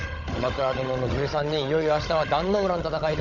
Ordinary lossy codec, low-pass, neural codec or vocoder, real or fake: none; 7.2 kHz; codec, 16 kHz, 16 kbps, FunCodec, trained on Chinese and English, 50 frames a second; fake